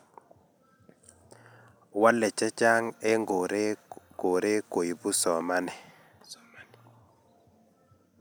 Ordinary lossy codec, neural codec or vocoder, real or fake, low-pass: none; none; real; none